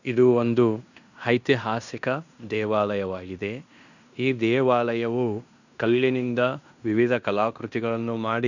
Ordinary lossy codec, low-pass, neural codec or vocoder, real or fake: none; 7.2 kHz; codec, 16 kHz in and 24 kHz out, 0.9 kbps, LongCat-Audio-Codec, fine tuned four codebook decoder; fake